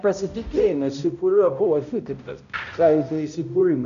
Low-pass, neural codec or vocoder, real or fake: 7.2 kHz; codec, 16 kHz, 0.5 kbps, X-Codec, HuBERT features, trained on balanced general audio; fake